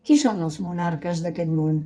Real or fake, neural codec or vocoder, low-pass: fake; codec, 16 kHz in and 24 kHz out, 1.1 kbps, FireRedTTS-2 codec; 9.9 kHz